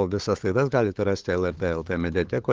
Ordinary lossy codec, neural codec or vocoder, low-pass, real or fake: Opus, 16 kbps; codec, 16 kHz, 8 kbps, FunCodec, trained on LibriTTS, 25 frames a second; 7.2 kHz; fake